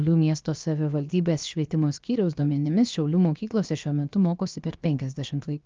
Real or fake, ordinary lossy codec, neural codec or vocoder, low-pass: fake; Opus, 24 kbps; codec, 16 kHz, about 1 kbps, DyCAST, with the encoder's durations; 7.2 kHz